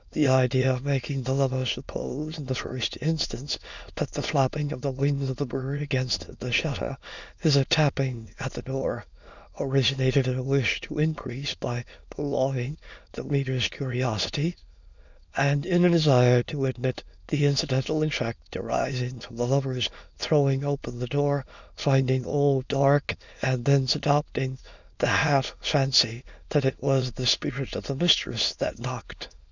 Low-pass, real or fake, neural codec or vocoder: 7.2 kHz; fake; autoencoder, 22.05 kHz, a latent of 192 numbers a frame, VITS, trained on many speakers